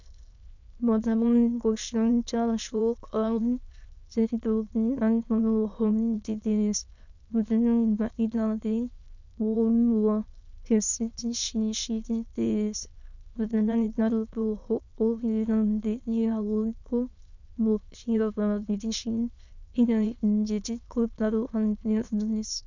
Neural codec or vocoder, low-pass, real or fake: autoencoder, 22.05 kHz, a latent of 192 numbers a frame, VITS, trained on many speakers; 7.2 kHz; fake